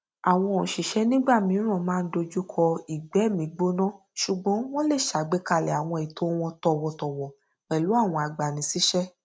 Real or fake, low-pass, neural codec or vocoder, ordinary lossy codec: real; none; none; none